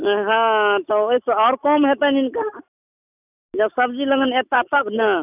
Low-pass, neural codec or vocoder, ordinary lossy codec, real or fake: 3.6 kHz; none; none; real